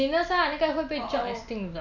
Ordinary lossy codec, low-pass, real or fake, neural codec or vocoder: none; 7.2 kHz; real; none